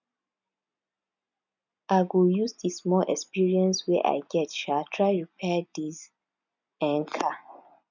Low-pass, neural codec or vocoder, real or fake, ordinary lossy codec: 7.2 kHz; none; real; none